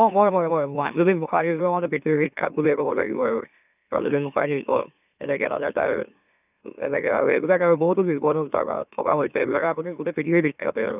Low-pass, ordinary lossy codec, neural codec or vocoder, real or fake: 3.6 kHz; none; autoencoder, 44.1 kHz, a latent of 192 numbers a frame, MeloTTS; fake